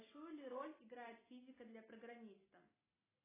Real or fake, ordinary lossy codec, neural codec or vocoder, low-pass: real; AAC, 16 kbps; none; 3.6 kHz